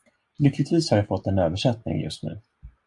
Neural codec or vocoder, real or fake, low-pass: none; real; 10.8 kHz